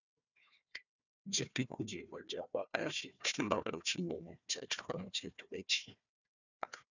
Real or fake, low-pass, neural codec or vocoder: fake; 7.2 kHz; codec, 16 kHz, 1 kbps, FunCodec, trained on Chinese and English, 50 frames a second